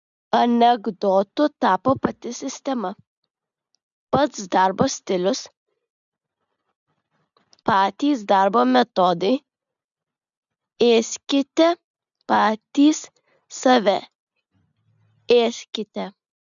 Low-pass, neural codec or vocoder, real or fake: 7.2 kHz; none; real